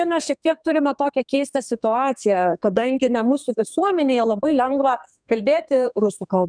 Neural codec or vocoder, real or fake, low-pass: codec, 32 kHz, 1.9 kbps, SNAC; fake; 9.9 kHz